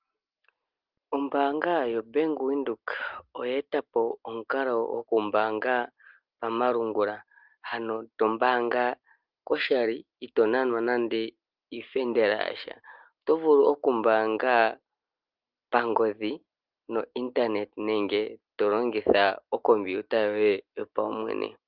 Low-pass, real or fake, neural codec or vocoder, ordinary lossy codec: 5.4 kHz; real; none; Opus, 32 kbps